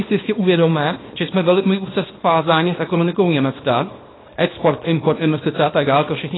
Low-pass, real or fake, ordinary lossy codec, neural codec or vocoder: 7.2 kHz; fake; AAC, 16 kbps; codec, 24 kHz, 0.9 kbps, WavTokenizer, small release